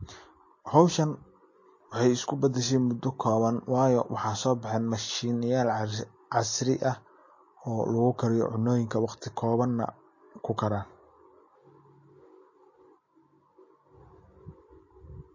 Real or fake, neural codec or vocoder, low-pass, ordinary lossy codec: real; none; 7.2 kHz; MP3, 32 kbps